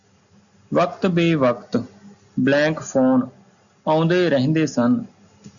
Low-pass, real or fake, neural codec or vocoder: 7.2 kHz; real; none